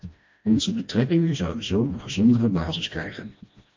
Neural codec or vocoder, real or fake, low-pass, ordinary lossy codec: codec, 16 kHz, 1 kbps, FreqCodec, smaller model; fake; 7.2 kHz; MP3, 48 kbps